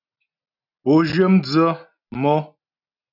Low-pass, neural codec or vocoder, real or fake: 5.4 kHz; none; real